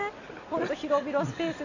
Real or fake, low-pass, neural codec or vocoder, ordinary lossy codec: real; 7.2 kHz; none; none